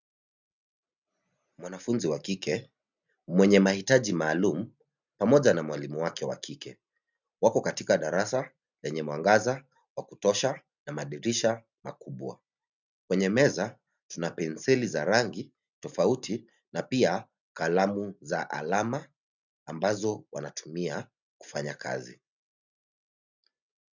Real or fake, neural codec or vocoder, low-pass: real; none; 7.2 kHz